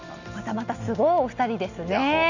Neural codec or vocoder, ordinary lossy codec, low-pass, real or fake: none; none; 7.2 kHz; real